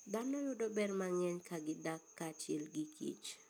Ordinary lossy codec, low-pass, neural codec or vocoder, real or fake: none; none; none; real